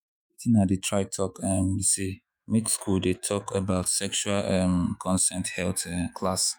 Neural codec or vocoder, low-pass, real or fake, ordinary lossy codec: autoencoder, 48 kHz, 128 numbers a frame, DAC-VAE, trained on Japanese speech; none; fake; none